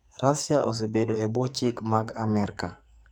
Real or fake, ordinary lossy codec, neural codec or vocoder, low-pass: fake; none; codec, 44.1 kHz, 2.6 kbps, SNAC; none